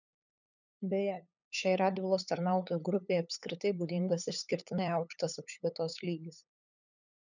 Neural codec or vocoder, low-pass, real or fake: codec, 16 kHz, 8 kbps, FunCodec, trained on LibriTTS, 25 frames a second; 7.2 kHz; fake